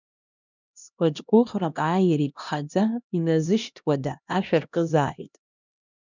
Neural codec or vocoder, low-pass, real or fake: codec, 16 kHz, 1 kbps, X-Codec, HuBERT features, trained on LibriSpeech; 7.2 kHz; fake